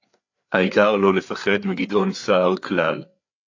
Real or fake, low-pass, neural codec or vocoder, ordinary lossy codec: fake; 7.2 kHz; codec, 16 kHz, 4 kbps, FreqCodec, larger model; AAC, 48 kbps